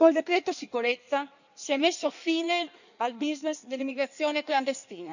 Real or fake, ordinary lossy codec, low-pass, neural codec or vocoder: fake; none; 7.2 kHz; codec, 16 kHz in and 24 kHz out, 1.1 kbps, FireRedTTS-2 codec